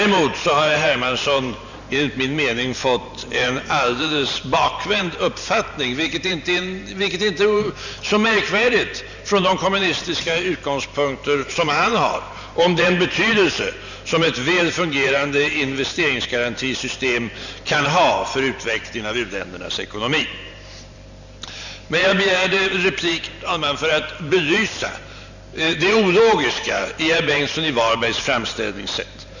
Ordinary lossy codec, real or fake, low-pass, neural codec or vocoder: none; fake; 7.2 kHz; vocoder, 44.1 kHz, 128 mel bands every 512 samples, BigVGAN v2